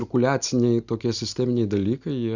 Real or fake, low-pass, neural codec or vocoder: real; 7.2 kHz; none